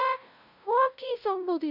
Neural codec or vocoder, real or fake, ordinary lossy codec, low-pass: codec, 16 kHz, 0.5 kbps, FunCodec, trained on LibriTTS, 25 frames a second; fake; none; 5.4 kHz